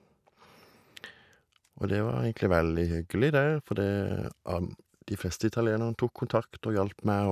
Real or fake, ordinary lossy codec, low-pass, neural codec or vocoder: real; none; 14.4 kHz; none